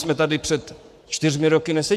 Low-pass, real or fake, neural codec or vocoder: 14.4 kHz; fake; codec, 44.1 kHz, 7.8 kbps, Pupu-Codec